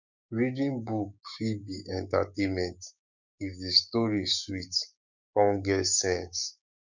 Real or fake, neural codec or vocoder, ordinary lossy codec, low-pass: fake; codec, 16 kHz, 6 kbps, DAC; none; 7.2 kHz